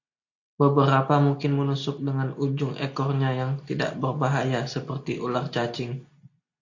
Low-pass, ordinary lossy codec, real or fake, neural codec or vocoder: 7.2 kHz; AAC, 48 kbps; real; none